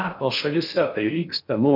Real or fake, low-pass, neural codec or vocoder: fake; 5.4 kHz; codec, 16 kHz in and 24 kHz out, 0.6 kbps, FocalCodec, streaming, 4096 codes